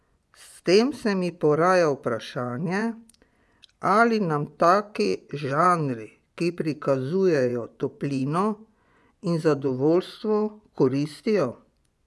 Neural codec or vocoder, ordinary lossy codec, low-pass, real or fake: vocoder, 24 kHz, 100 mel bands, Vocos; none; none; fake